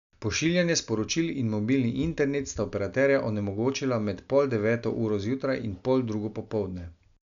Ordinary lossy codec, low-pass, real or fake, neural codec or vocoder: none; 7.2 kHz; real; none